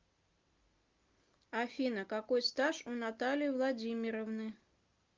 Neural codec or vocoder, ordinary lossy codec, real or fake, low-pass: none; Opus, 32 kbps; real; 7.2 kHz